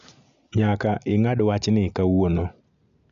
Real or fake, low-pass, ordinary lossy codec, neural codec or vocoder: real; 7.2 kHz; AAC, 96 kbps; none